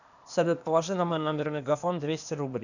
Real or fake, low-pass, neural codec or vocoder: fake; 7.2 kHz; codec, 16 kHz, 0.8 kbps, ZipCodec